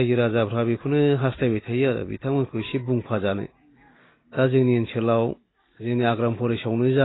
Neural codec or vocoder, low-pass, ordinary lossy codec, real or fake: none; 7.2 kHz; AAC, 16 kbps; real